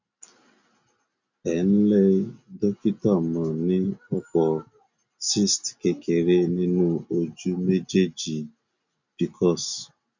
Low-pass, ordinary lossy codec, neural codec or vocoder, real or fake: 7.2 kHz; none; none; real